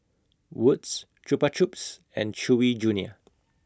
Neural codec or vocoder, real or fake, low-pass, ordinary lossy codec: none; real; none; none